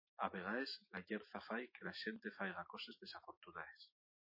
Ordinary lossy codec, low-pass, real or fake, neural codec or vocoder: MP3, 24 kbps; 5.4 kHz; fake; autoencoder, 48 kHz, 128 numbers a frame, DAC-VAE, trained on Japanese speech